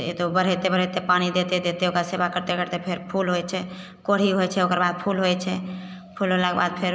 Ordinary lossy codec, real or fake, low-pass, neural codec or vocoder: none; real; none; none